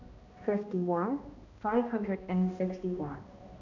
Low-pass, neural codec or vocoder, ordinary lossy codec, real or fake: 7.2 kHz; codec, 16 kHz, 1 kbps, X-Codec, HuBERT features, trained on balanced general audio; none; fake